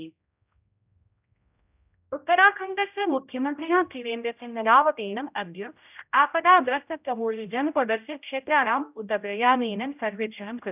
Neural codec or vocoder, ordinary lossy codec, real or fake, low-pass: codec, 16 kHz, 0.5 kbps, X-Codec, HuBERT features, trained on general audio; none; fake; 3.6 kHz